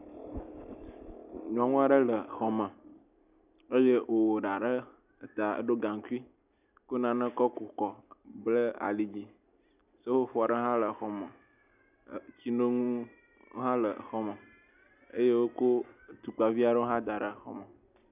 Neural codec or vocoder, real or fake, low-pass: none; real; 3.6 kHz